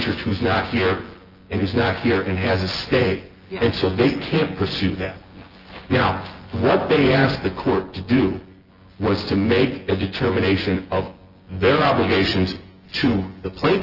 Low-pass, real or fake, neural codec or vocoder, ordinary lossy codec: 5.4 kHz; fake; vocoder, 24 kHz, 100 mel bands, Vocos; Opus, 16 kbps